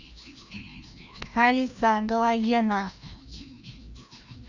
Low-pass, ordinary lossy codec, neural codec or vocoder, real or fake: 7.2 kHz; Opus, 64 kbps; codec, 16 kHz, 1 kbps, FreqCodec, larger model; fake